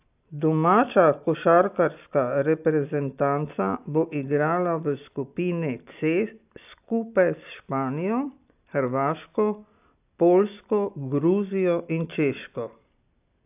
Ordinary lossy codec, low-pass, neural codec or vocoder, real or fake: AAC, 32 kbps; 3.6 kHz; none; real